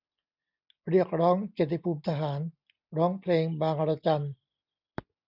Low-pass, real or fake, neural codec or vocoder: 5.4 kHz; real; none